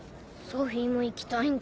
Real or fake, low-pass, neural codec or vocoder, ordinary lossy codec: real; none; none; none